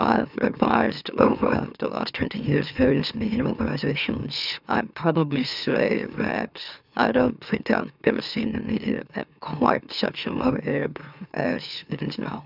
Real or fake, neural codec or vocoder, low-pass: fake; autoencoder, 44.1 kHz, a latent of 192 numbers a frame, MeloTTS; 5.4 kHz